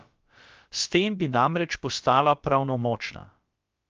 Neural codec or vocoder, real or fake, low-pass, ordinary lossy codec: codec, 16 kHz, about 1 kbps, DyCAST, with the encoder's durations; fake; 7.2 kHz; Opus, 24 kbps